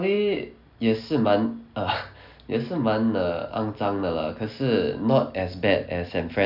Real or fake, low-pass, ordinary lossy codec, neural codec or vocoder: real; 5.4 kHz; none; none